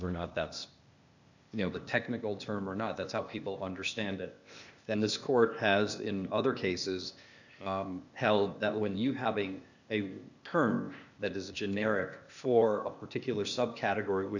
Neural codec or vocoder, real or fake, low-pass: codec, 16 kHz, 0.8 kbps, ZipCodec; fake; 7.2 kHz